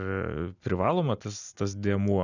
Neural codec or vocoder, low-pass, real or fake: none; 7.2 kHz; real